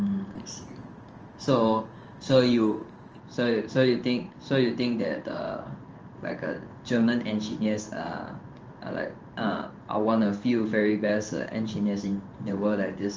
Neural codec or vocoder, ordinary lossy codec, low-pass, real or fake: codec, 16 kHz in and 24 kHz out, 1 kbps, XY-Tokenizer; Opus, 24 kbps; 7.2 kHz; fake